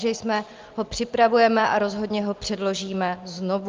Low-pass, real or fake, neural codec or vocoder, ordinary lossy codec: 7.2 kHz; real; none; Opus, 32 kbps